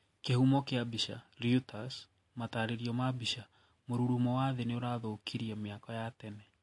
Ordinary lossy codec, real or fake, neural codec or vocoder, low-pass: MP3, 48 kbps; real; none; 10.8 kHz